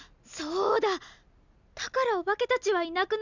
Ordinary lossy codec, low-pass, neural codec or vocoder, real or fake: none; 7.2 kHz; none; real